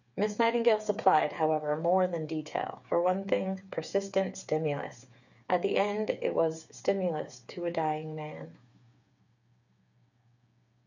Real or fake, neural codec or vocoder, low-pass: fake; codec, 16 kHz, 8 kbps, FreqCodec, smaller model; 7.2 kHz